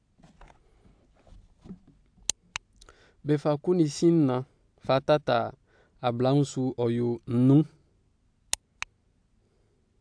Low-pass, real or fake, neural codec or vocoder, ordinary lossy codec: 9.9 kHz; real; none; AAC, 64 kbps